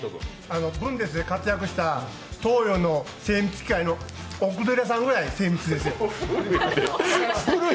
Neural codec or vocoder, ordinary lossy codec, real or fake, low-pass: none; none; real; none